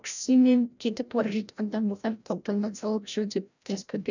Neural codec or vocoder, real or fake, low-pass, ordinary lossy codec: codec, 16 kHz, 0.5 kbps, FreqCodec, larger model; fake; 7.2 kHz; none